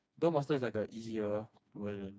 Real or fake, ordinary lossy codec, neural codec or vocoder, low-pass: fake; none; codec, 16 kHz, 2 kbps, FreqCodec, smaller model; none